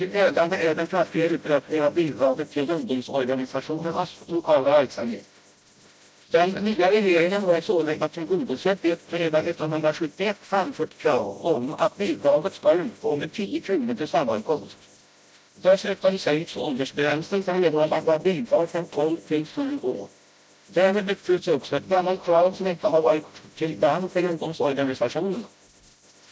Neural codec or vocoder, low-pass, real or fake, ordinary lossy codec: codec, 16 kHz, 0.5 kbps, FreqCodec, smaller model; none; fake; none